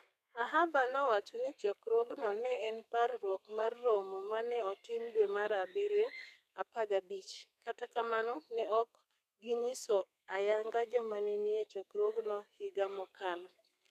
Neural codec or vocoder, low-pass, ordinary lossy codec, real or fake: codec, 32 kHz, 1.9 kbps, SNAC; 14.4 kHz; none; fake